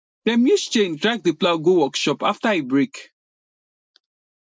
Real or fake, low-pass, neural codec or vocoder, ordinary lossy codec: real; none; none; none